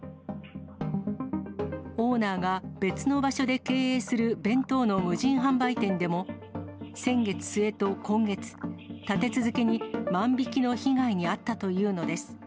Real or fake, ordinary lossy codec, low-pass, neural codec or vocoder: real; none; none; none